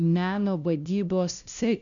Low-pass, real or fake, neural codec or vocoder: 7.2 kHz; fake; codec, 16 kHz, 0.5 kbps, FunCodec, trained on LibriTTS, 25 frames a second